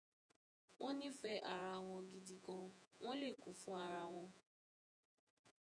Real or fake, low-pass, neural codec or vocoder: fake; 9.9 kHz; vocoder, 48 kHz, 128 mel bands, Vocos